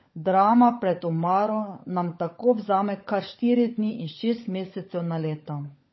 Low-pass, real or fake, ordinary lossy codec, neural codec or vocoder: 7.2 kHz; fake; MP3, 24 kbps; codec, 16 kHz, 16 kbps, FunCodec, trained on LibriTTS, 50 frames a second